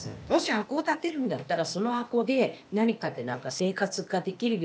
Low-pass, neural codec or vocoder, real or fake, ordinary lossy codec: none; codec, 16 kHz, 0.8 kbps, ZipCodec; fake; none